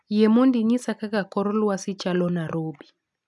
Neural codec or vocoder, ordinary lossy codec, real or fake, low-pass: none; none; real; none